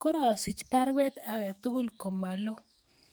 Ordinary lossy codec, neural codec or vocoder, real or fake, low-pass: none; codec, 44.1 kHz, 2.6 kbps, SNAC; fake; none